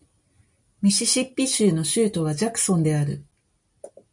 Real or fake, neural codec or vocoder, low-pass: real; none; 10.8 kHz